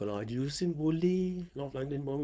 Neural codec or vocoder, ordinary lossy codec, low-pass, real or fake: codec, 16 kHz, 4.8 kbps, FACodec; none; none; fake